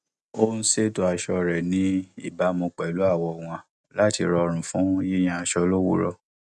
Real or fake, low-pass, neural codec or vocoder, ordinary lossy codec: real; none; none; none